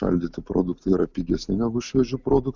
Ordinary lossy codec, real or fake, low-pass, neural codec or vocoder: Opus, 64 kbps; real; 7.2 kHz; none